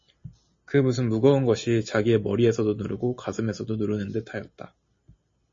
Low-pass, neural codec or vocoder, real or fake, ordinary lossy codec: 7.2 kHz; none; real; MP3, 32 kbps